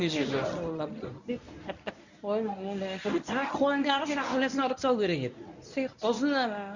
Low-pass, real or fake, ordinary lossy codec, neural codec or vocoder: 7.2 kHz; fake; none; codec, 24 kHz, 0.9 kbps, WavTokenizer, medium speech release version 1